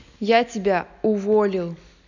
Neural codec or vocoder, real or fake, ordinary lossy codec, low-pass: none; real; none; 7.2 kHz